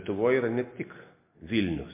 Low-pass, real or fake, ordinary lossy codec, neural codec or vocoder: 3.6 kHz; real; MP3, 24 kbps; none